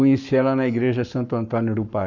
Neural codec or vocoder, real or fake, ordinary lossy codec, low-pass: codec, 44.1 kHz, 7.8 kbps, Pupu-Codec; fake; none; 7.2 kHz